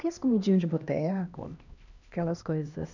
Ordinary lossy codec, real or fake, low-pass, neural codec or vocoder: none; fake; 7.2 kHz; codec, 16 kHz, 1 kbps, X-Codec, HuBERT features, trained on LibriSpeech